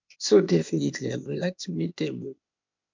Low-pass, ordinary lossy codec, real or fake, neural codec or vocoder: 7.2 kHz; MP3, 64 kbps; fake; codec, 16 kHz, 0.8 kbps, ZipCodec